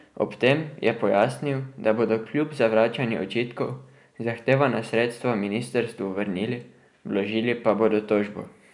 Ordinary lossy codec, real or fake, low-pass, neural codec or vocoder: none; real; 10.8 kHz; none